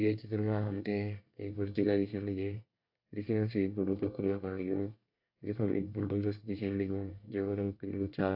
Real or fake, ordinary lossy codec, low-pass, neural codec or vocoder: fake; AAC, 48 kbps; 5.4 kHz; codec, 24 kHz, 1 kbps, SNAC